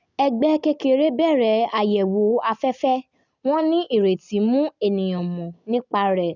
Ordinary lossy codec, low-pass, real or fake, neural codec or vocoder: none; 7.2 kHz; real; none